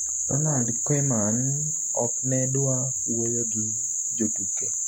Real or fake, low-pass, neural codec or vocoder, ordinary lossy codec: real; 19.8 kHz; none; none